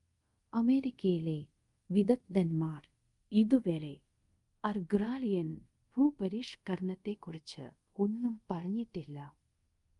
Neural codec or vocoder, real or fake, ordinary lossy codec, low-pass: codec, 24 kHz, 0.5 kbps, DualCodec; fake; Opus, 32 kbps; 10.8 kHz